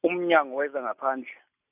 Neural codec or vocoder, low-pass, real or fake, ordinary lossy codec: none; 3.6 kHz; real; none